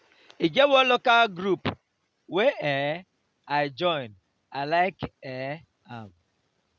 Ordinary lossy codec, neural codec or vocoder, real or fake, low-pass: none; none; real; none